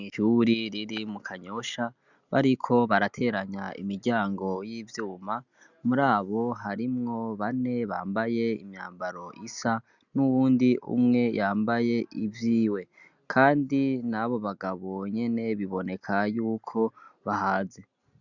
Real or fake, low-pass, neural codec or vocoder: real; 7.2 kHz; none